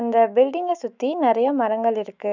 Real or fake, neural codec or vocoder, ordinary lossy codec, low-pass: fake; autoencoder, 48 kHz, 128 numbers a frame, DAC-VAE, trained on Japanese speech; none; 7.2 kHz